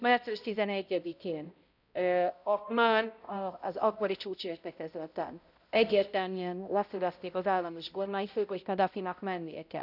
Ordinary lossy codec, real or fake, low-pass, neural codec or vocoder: none; fake; 5.4 kHz; codec, 16 kHz, 0.5 kbps, X-Codec, HuBERT features, trained on balanced general audio